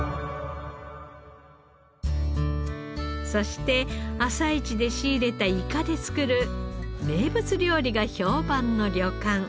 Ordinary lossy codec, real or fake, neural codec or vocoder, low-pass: none; real; none; none